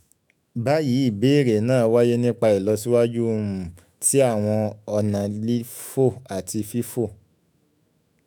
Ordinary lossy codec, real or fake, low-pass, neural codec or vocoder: none; fake; none; autoencoder, 48 kHz, 128 numbers a frame, DAC-VAE, trained on Japanese speech